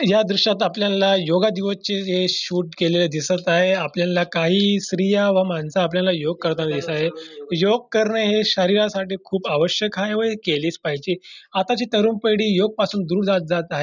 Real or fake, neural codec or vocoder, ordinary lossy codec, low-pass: real; none; none; 7.2 kHz